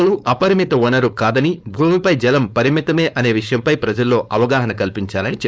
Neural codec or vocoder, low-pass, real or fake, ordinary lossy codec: codec, 16 kHz, 4.8 kbps, FACodec; none; fake; none